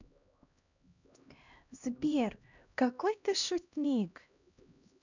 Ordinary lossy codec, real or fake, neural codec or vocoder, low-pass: none; fake; codec, 16 kHz, 1 kbps, X-Codec, HuBERT features, trained on LibriSpeech; 7.2 kHz